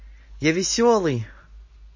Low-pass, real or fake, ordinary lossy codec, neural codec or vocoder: 7.2 kHz; real; MP3, 32 kbps; none